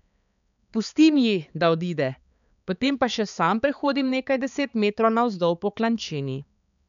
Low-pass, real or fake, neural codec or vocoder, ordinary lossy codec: 7.2 kHz; fake; codec, 16 kHz, 4 kbps, X-Codec, HuBERT features, trained on balanced general audio; MP3, 96 kbps